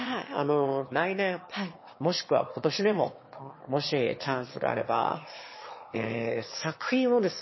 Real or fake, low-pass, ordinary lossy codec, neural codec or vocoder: fake; 7.2 kHz; MP3, 24 kbps; autoencoder, 22.05 kHz, a latent of 192 numbers a frame, VITS, trained on one speaker